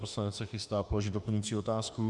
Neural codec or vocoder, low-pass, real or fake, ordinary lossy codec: autoencoder, 48 kHz, 32 numbers a frame, DAC-VAE, trained on Japanese speech; 10.8 kHz; fake; Opus, 64 kbps